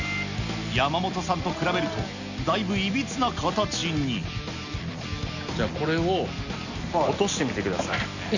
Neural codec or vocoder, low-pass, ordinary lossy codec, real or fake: none; 7.2 kHz; none; real